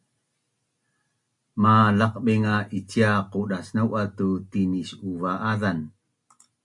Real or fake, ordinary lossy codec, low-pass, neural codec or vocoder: real; MP3, 64 kbps; 10.8 kHz; none